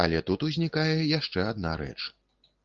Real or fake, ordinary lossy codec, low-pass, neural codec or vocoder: real; Opus, 32 kbps; 7.2 kHz; none